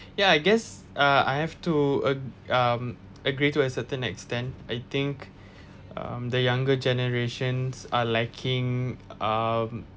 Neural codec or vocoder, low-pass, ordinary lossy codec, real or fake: none; none; none; real